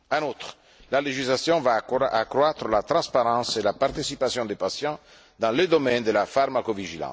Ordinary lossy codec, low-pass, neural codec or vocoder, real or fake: none; none; none; real